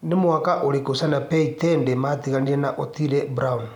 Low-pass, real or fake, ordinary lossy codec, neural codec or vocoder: 19.8 kHz; real; none; none